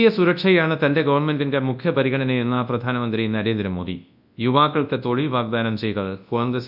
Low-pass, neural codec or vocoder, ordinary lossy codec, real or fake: 5.4 kHz; codec, 24 kHz, 0.9 kbps, WavTokenizer, large speech release; none; fake